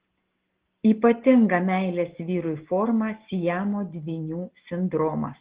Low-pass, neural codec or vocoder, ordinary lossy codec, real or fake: 3.6 kHz; none; Opus, 16 kbps; real